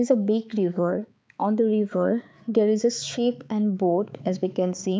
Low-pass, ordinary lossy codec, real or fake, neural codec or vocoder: none; none; fake; codec, 16 kHz, 4 kbps, X-Codec, HuBERT features, trained on balanced general audio